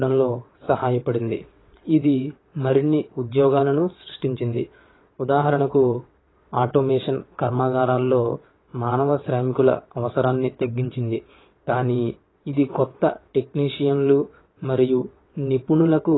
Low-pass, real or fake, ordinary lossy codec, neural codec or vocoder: 7.2 kHz; fake; AAC, 16 kbps; vocoder, 22.05 kHz, 80 mel bands, WaveNeXt